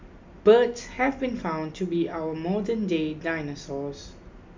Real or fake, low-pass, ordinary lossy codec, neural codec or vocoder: real; 7.2 kHz; MP3, 64 kbps; none